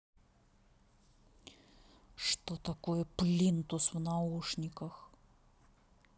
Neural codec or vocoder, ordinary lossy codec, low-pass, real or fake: none; none; none; real